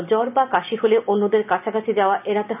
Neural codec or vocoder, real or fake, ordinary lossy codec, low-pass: none; real; none; 3.6 kHz